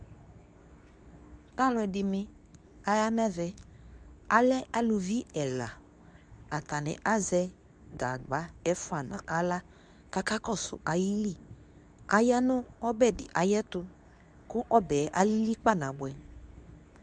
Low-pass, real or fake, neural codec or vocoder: 9.9 kHz; fake; codec, 24 kHz, 0.9 kbps, WavTokenizer, medium speech release version 2